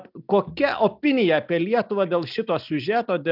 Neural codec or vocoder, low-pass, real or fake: none; 5.4 kHz; real